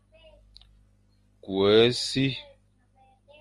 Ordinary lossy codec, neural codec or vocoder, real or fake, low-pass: Opus, 32 kbps; none; real; 10.8 kHz